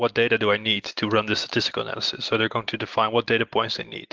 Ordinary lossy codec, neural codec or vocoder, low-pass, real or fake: Opus, 16 kbps; none; 7.2 kHz; real